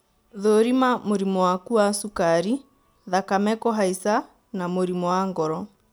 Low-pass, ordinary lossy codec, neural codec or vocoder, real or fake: none; none; none; real